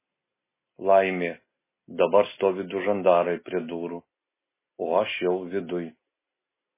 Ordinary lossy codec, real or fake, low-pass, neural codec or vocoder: MP3, 16 kbps; real; 3.6 kHz; none